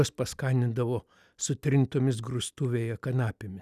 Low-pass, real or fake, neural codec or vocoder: 14.4 kHz; real; none